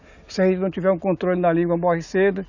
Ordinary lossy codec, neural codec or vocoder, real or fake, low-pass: none; none; real; 7.2 kHz